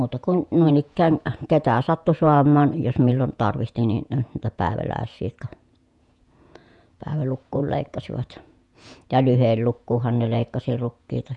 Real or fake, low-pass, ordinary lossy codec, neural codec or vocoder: fake; 10.8 kHz; none; vocoder, 48 kHz, 128 mel bands, Vocos